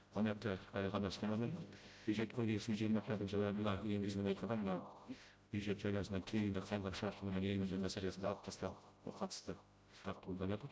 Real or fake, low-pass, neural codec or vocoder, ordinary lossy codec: fake; none; codec, 16 kHz, 0.5 kbps, FreqCodec, smaller model; none